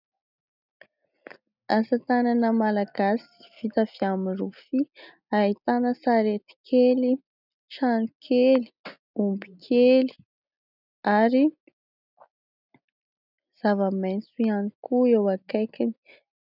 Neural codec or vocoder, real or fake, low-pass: none; real; 5.4 kHz